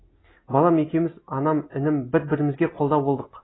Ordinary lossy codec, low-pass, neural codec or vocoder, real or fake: AAC, 16 kbps; 7.2 kHz; none; real